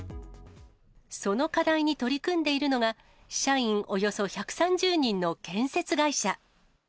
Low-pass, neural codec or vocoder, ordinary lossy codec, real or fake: none; none; none; real